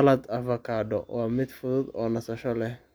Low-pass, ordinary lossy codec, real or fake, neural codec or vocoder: none; none; real; none